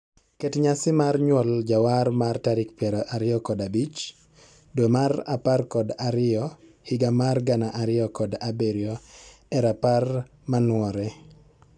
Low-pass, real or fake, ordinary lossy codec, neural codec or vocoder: 9.9 kHz; real; none; none